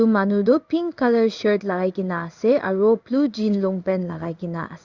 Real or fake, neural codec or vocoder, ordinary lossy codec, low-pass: fake; codec, 16 kHz in and 24 kHz out, 1 kbps, XY-Tokenizer; Opus, 64 kbps; 7.2 kHz